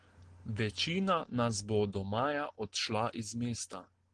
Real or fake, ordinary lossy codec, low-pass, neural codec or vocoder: real; Opus, 16 kbps; 9.9 kHz; none